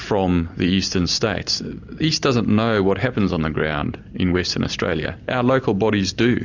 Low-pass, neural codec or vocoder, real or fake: 7.2 kHz; none; real